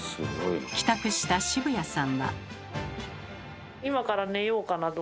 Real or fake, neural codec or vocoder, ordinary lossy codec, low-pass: real; none; none; none